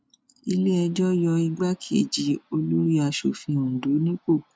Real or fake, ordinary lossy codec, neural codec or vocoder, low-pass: real; none; none; none